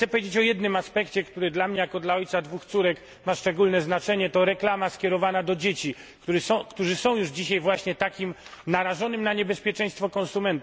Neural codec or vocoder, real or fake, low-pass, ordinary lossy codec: none; real; none; none